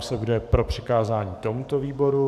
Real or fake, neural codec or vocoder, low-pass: fake; codec, 44.1 kHz, 7.8 kbps, DAC; 14.4 kHz